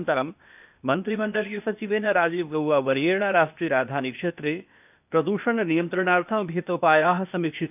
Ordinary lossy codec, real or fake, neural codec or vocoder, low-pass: none; fake; codec, 16 kHz, 0.8 kbps, ZipCodec; 3.6 kHz